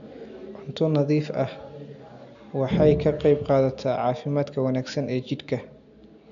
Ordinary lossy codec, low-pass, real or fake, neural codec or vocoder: none; 7.2 kHz; real; none